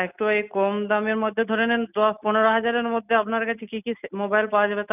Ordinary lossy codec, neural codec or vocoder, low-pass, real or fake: none; none; 3.6 kHz; real